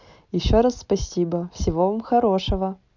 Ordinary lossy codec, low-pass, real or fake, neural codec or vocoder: none; 7.2 kHz; real; none